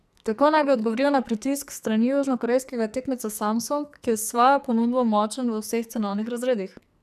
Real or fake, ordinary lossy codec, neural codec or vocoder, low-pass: fake; none; codec, 44.1 kHz, 2.6 kbps, SNAC; 14.4 kHz